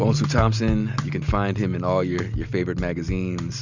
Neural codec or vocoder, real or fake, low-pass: none; real; 7.2 kHz